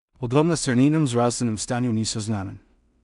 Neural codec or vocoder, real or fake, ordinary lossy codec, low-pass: codec, 16 kHz in and 24 kHz out, 0.4 kbps, LongCat-Audio-Codec, two codebook decoder; fake; none; 10.8 kHz